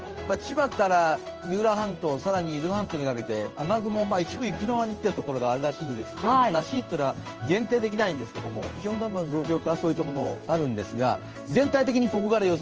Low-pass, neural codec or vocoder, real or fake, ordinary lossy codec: 7.2 kHz; codec, 16 kHz in and 24 kHz out, 1 kbps, XY-Tokenizer; fake; Opus, 24 kbps